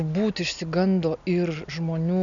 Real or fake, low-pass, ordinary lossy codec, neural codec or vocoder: real; 7.2 kHz; MP3, 96 kbps; none